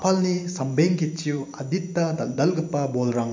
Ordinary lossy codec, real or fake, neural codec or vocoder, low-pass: MP3, 48 kbps; fake; vocoder, 44.1 kHz, 128 mel bands every 512 samples, BigVGAN v2; 7.2 kHz